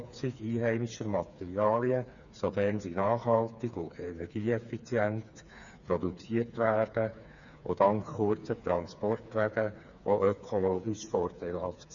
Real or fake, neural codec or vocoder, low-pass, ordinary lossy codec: fake; codec, 16 kHz, 4 kbps, FreqCodec, smaller model; 7.2 kHz; none